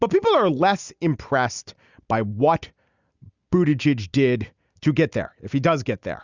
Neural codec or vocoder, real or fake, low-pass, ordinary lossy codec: none; real; 7.2 kHz; Opus, 64 kbps